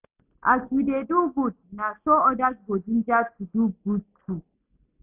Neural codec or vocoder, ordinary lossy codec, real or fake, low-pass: none; none; real; 3.6 kHz